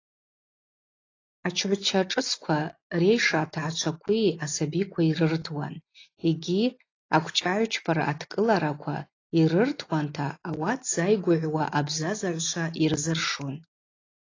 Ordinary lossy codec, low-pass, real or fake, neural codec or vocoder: AAC, 32 kbps; 7.2 kHz; real; none